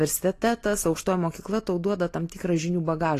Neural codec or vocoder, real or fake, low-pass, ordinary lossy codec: none; real; 14.4 kHz; AAC, 48 kbps